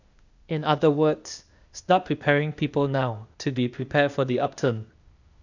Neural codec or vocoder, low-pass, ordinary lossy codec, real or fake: codec, 16 kHz, 0.8 kbps, ZipCodec; 7.2 kHz; none; fake